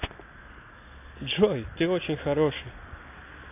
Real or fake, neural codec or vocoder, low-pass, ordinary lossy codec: real; none; 3.6 kHz; AAC, 24 kbps